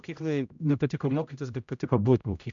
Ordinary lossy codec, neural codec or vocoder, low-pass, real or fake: MP3, 64 kbps; codec, 16 kHz, 0.5 kbps, X-Codec, HuBERT features, trained on general audio; 7.2 kHz; fake